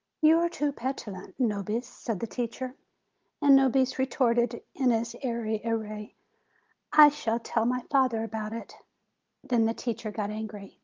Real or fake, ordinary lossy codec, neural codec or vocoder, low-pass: real; Opus, 32 kbps; none; 7.2 kHz